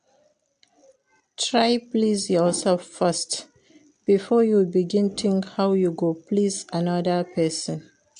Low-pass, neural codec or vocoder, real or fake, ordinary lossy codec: 9.9 kHz; none; real; AAC, 64 kbps